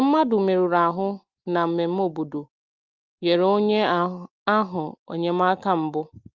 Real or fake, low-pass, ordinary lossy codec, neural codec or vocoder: real; 7.2 kHz; Opus, 32 kbps; none